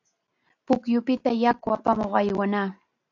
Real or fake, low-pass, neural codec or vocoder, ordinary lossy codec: real; 7.2 kHz; none; AAC, 48 kbps